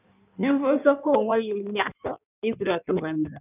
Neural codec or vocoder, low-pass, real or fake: codec, 16 kHz in and 24 kHz out, 1.1 kbps, FireRedTTS-2 codec; 3.6 kHz; fake